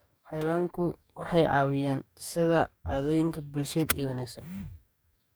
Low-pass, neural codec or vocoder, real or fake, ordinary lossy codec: none; codec, 44.1 kHz, 2.6 kbps, DAC; fake; none